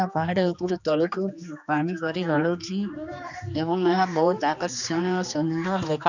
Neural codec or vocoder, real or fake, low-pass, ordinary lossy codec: codec, 16 kHz, 2 kbps, X-Codec, HuBERT features, trained on general audio; fake; 7.2 kHz; none